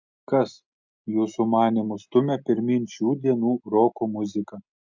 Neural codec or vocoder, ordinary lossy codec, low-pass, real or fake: none; MP3, 64 kbps; 7.2 kHz; real